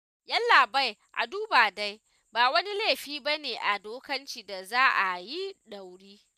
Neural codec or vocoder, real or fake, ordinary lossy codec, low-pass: none; real; none; 14.4 kHz